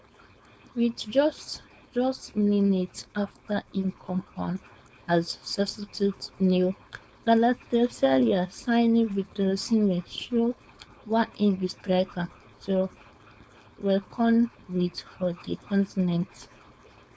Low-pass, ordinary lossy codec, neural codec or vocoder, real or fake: none; none; codec, 16 kHz, 4.8 kbps, FACodec; fake